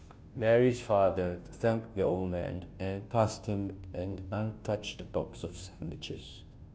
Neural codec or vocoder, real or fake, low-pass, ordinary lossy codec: codec, 16 kHz, 0.5 kbps, FunCodec, trained on Chinese and English, 25 frames a second; fake; none; none